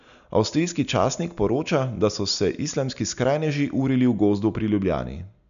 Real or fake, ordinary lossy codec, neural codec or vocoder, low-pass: real; none; none; 7.2 kHz